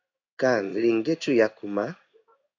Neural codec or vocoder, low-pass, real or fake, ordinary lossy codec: codec, 16 kHz in and 24 kHz out, 1 kbps, XY-Tokenizer; 7.2 kHz; fake; AAC, 48 kbps